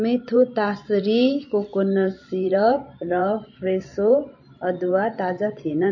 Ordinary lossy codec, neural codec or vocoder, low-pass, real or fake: MP3, 32 kbps; vocoder, 44.1 kHz, 128 mel bands every 512 samples, BigVGAN v2; 7.2 kHz; fake